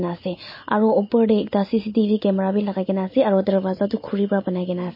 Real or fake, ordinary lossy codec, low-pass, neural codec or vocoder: real; MP3, 24 kbps; 5.4 kHz; none